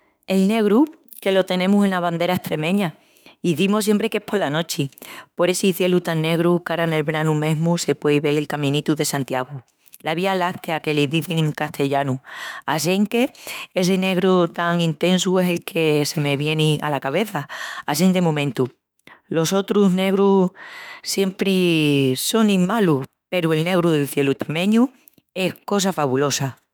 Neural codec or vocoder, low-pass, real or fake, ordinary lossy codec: autoencoder, 48 kHz, 32 numbers a frame, DAC-VAE, trained on Japanese speech; none; fake; none